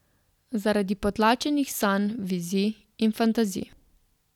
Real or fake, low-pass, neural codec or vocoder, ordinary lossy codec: real; 19.8 kHz; none; none